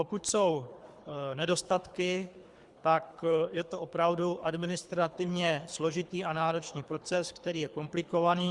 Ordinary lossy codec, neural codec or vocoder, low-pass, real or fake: Opus, 64 kbps; codec, 24 kHz, 3 kbps, HILCodec; 10.8 kHz; fake